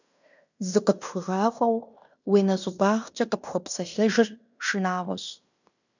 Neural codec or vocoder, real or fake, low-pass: codec, 16 kHz in and 24 kHz out, 0.9 kbps, LongCat-Audio-Codec, fine tuned four codebook decoder; fake; 7.2 kHz